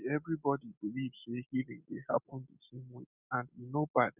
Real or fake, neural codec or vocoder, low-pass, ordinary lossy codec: real; none; 3.6 kHz; none